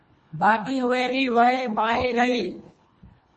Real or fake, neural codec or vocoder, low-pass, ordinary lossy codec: fake; codec, 24 kHz, 1.5 kbps, HILCodec; 10.8 kHz; MP3, 32 kbps